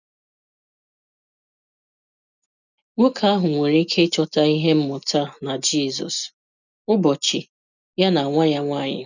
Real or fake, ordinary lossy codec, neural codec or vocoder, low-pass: real; none; none; 7.2 kHz